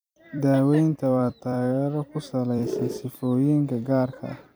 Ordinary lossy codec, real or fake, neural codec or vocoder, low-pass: none; fake; vocoder, 44.1 kHz, 128 mel bands every 256 samples, BigVGAN v2; none